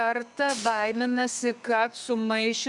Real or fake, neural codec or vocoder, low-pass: fake; codec, 32 kHz, 1.9 kbps, SNAC; 10.8 kHz